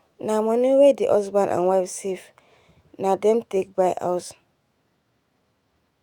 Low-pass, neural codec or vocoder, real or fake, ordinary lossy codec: 19.8 kHz; autoencoder, 48 kHz, 128 numbers a frame, DAC-VAE, trained on Japanese speech; fake; Opus, 64 kbps